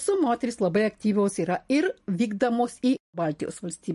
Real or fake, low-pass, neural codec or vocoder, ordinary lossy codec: real; 14.4 kHz; none; MP3, 48 kbps